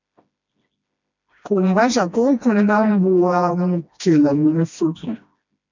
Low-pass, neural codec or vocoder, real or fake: 7.2 kHz; codec, 16 kHz, 1 kbps, FreqCodec, smaller model; fake